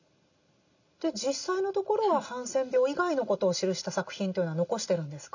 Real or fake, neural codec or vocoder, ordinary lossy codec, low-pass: real; none; none; 7.2 kHz